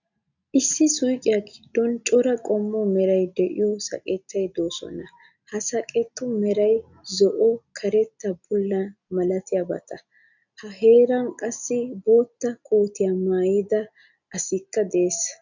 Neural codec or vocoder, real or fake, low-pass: none; real; 7.2 kHz